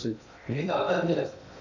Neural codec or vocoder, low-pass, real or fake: codec, 16 kHz in and 24 kHz out, 0.6 kbps, FocalCodec, streaming, 2048 codes; 7.2 kHz; fake